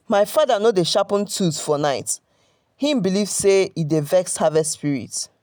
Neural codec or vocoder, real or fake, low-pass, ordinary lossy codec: none; real; none; none